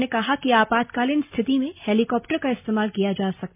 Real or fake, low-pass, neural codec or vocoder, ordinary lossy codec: real; 3.6 kHz; none; MP3, 32 kbps